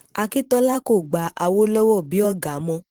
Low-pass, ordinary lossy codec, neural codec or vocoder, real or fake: 19.8 kHz; Opus, 16 kbps; vocoder, 44.1 kHz, 128 mel bands every 512 samples, BigVGAN v2; fake